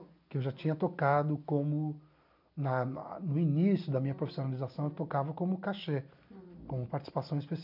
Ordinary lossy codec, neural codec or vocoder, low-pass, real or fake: none; none; 5.4 kHz; real